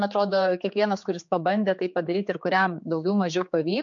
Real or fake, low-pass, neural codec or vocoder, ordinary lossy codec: fake; 7.2 kHz; codec, 16 kHz, 4 kbps, X-Codec, HuBERT features, trained on general audio; MP3, 48 kbps